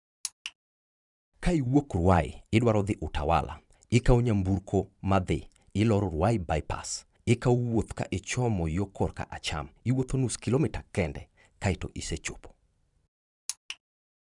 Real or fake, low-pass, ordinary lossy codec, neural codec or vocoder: fake; 10.8 kHz; none; vocoder, 44.1 kHz, 128 mel bands every 512 samples, BigVGAN v2